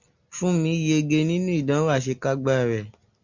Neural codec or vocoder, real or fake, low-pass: none; real; 7.2 kHz